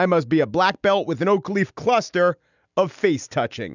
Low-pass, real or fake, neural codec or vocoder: 7.2 kHz; real; none